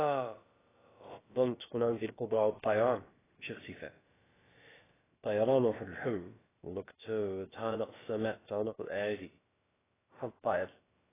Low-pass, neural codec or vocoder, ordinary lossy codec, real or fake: 3.6 kHz; codec, 16 kHz, about 1 kbps, DyCAST, with the encoder's durations; AAC, 16 kbps; fake